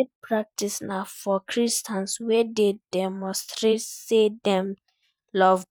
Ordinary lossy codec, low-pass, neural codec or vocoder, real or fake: none; 19.8 kHz; vocoder, 44.1 kHz, 128 mel bands every 512 samples, BigVGAN v2; fake